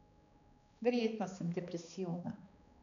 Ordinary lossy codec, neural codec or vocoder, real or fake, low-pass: none; codec, 16 kHz, 2 kbps, X-Codec, HuBERT features, trained on balanced general audio; fake; 7.2 kHz